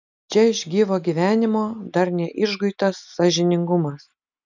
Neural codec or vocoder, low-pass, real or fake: none; 7.2 kHz; real